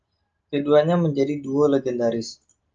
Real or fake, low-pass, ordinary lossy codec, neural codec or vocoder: real; 7.2 kHz; Opus, 24 kbps; none